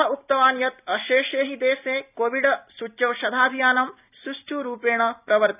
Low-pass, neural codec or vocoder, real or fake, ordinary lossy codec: 3.6 kHz; none; real; none